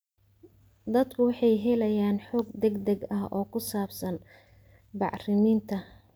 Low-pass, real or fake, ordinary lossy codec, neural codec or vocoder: none; real; none; none